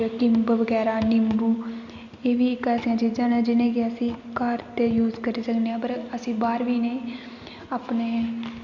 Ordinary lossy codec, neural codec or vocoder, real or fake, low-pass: Opus, 64 kbps; none; real; 7.2 kHz